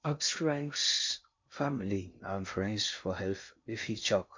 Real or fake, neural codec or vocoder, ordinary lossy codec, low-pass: fake; codec, 16 kHz in and 24 kHz out, 0.6 kbps, FocalCodec, streaming, 2048 codes; MP3, 48 kbps; 7.2 kHz